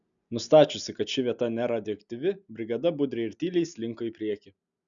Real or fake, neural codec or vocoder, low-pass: real; none; 7.2 kHz